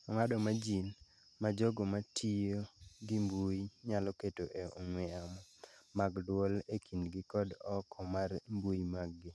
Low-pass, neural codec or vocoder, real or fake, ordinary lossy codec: none; none; real; none